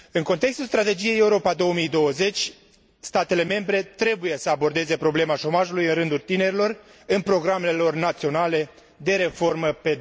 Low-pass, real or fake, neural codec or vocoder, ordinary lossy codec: none; real; none; none